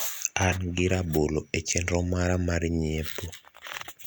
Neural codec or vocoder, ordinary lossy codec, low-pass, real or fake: none; none; none; real